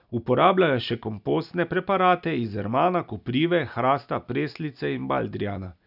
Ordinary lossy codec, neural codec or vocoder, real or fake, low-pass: none; none; real; 5.4 kHz